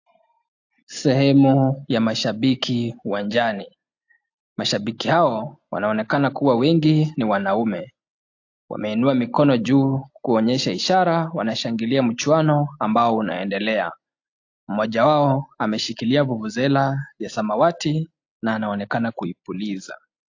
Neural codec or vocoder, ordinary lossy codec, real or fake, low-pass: none; AAC, 48 kbps; real; 7.2 kHz